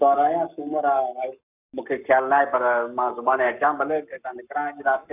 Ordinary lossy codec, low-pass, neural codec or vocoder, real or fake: none; 3.6 kHz; codec, 44.1 kHz, 7.8 kbps, Pupu-Codec; fake